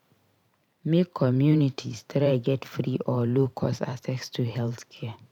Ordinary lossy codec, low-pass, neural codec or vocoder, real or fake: none; 19.8 kHz; vocoder, 44.1 kHz, 128 mel bands every 512 samples, BigVGAN v2; fake